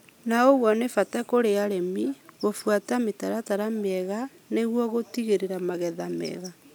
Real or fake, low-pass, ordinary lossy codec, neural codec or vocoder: real; none; none; none